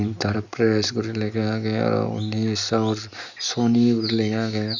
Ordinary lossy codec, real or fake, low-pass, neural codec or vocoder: none; real; 7.2 kHz; none